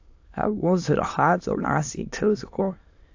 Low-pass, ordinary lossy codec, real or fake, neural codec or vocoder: 7.2 kHz; AAC, 48 kbps; fake; autoencoder, 22.05 kHz, a latent of 192 numbers a frame, VITS, trained on many speakers